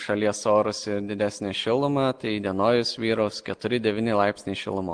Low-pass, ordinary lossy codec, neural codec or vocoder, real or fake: 9.9 kHz; Opus, 24 kbps; none; real